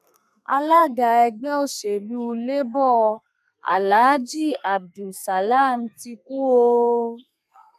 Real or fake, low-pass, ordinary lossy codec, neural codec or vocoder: fake; 14.4 kHz; none; codec, 32 kHz, 1.9 kbps, SNAC